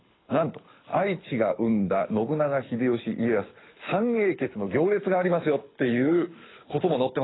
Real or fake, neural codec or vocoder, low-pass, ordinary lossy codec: fake; codec, 24 kHz, 6 kbps, HILCodec; 7.2 kHz; AAC, 16 kbps